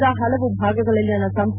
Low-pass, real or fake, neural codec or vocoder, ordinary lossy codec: 3.6 kHz; real; none; none